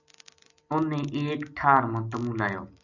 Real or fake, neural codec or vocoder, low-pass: real; none; 7.2 kHz